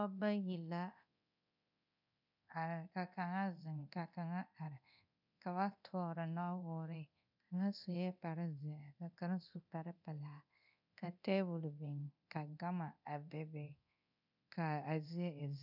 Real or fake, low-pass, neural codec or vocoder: fake; 5.4 kHz; codec, 24 kHz, 0.9 kbps, DualCodec